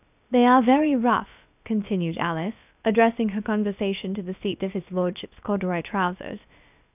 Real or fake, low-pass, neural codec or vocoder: fake; 3.6 kHz; codec, 16 kHz, about 1 kbps, DyCAST, with the encoder's durations